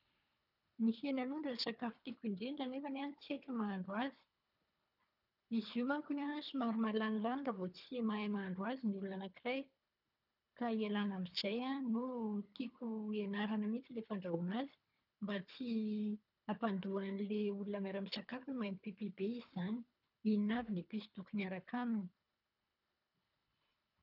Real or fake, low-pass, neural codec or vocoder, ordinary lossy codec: fake; 5.4 kHz; codec, 24 kHz, 3 kbps, HILCodec; none